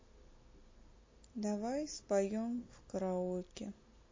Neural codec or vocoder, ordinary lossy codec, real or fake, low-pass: none; MP3, 32 kbps; real; 7.2 kHz